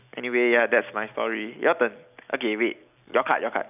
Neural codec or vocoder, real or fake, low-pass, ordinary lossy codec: none; real; 3.6 kHz; none